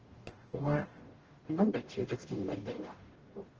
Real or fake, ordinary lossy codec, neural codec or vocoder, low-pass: fake; Opus, 16 kbps; codec, 44.1 kHz, 0.9 kbps, DAC; 7.2 kHz